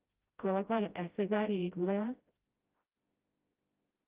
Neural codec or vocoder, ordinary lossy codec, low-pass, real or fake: codec, 16 kHz, 0.5 kbps, FreqCodec, smaller model; Opus, 32 kbps; 3.6 kHz; fake